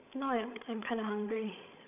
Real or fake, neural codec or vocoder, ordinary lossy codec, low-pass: fake; codec, 16 kHz, 16 kbps, FreqCodec, larger model; none; 3.6 kHz